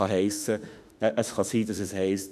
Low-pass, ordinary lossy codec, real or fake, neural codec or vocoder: 14.4 kHz; none; fake; autoencoder, 48 kHz, 32 numbers a frame, DAC-VAE, trained on Japanese speech